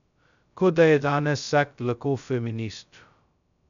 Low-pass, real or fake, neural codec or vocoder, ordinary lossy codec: 7.2 kHz; fake; codec, 16 kHz, 0.2 kbps, FocalCodec; none